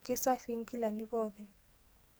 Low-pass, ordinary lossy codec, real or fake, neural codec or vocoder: none; none; fake; codec, 44.1 kHz, 2.6 kbps, SNAC